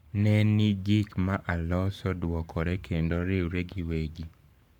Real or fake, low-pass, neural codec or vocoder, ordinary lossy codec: fake; 19.8 kHz; codec, 44.1 kHz, 7.8 kbps, Pupu-Codec; none